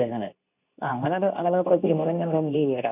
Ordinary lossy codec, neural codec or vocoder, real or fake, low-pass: none; codec, 24 kHz, 0.9 kbps, WavTokenizer, medium speech release version 2; fake; 3.6 kHz